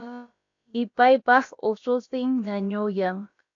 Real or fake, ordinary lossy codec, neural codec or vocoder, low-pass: fake; AAC, 64 kbps; codec, 16 kHz, about 1 kbps, DyCAST, with the encoder's durations; 7.2 kHz